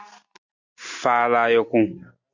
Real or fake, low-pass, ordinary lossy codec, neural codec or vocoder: real; 7.2 kHz; Opus, 64 kbps; none